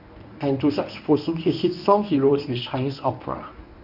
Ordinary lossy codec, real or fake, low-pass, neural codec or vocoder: none; fake; 5.4 kHz; codec, 24 kHz, 0.9 kbps, WavTokenizer, medium speech release version 1